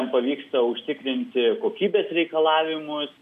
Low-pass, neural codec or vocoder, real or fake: 14.4 kHz; none; real